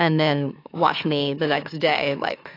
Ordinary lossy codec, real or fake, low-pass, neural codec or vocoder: AAC, 32 kbps; fake; 5.4 kHz; autoencoder, 44.1 kHz, a latent of 192 numbers a frame, MeloTTS